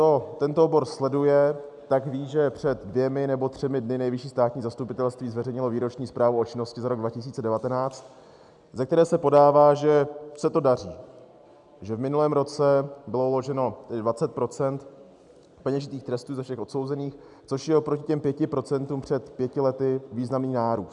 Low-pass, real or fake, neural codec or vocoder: 10.8 kHz; real; none